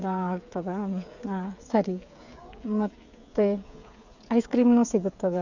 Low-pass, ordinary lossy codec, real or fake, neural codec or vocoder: 7.2 kHz; none; fake; codec, 16 kHz, 8 kbps, FreqCodec, smaller model